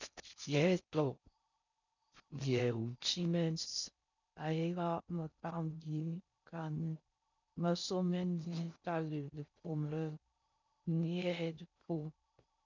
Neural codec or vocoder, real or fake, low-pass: codec, 16 kHz in and 24 kHz out, 0.6 kbps, FocalCodec, streaming, 4096 codes; fake; 7.2 kHz